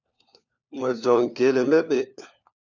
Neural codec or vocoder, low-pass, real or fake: codec, 16 kHz, 4 kbps, FunCodec, trained on LibriTTS, 50 frames a second; 7.2 kHz; fake